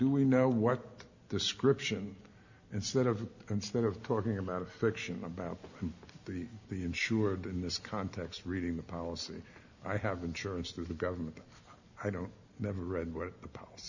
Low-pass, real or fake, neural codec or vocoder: 7.2 kHz; real; none